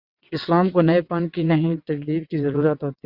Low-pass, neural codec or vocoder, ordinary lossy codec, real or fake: 5.4 kHz; vocoder, 22.05 kHz, 80 mel bands, WaveNeXt; Opus, 64 kbps; fake